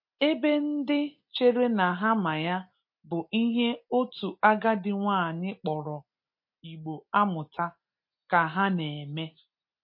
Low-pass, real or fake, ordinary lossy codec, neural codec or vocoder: 5.4 kHz; real; MP3, 32 kbps; none